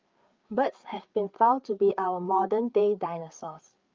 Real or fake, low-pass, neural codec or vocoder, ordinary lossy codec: fake; 7.2 kHz; codec, 16 kHz, 4 kbps, FreqCodec, larger model; Opus, 32 kbps